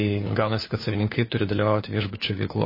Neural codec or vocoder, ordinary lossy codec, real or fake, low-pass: codec, 16 kHz, 4 kbps, FunCodec, trained on LibriTTS, 50 frames a second; MP3, 24 kbps; fake; 5.4 kHz